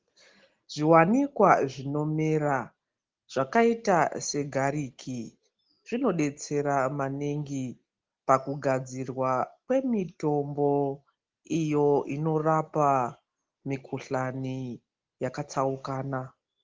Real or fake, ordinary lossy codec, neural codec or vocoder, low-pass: real; Opus, 16 kbps; none; 7.2 kHz